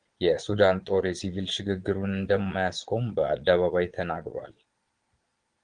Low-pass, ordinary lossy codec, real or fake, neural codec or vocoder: 9.9 kHz; Opus, 24 kbps; fake; vocoder, 22.05 kHz, 80 mel bands, Vocos